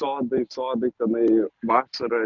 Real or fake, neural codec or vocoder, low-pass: real; none; 7.2 kHz